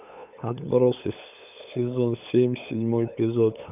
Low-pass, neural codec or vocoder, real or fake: 3.6 kHz; codec, 16 kHz, 8 kbps, FunCodec, trained on LibriTTS, 25 frames a second; fake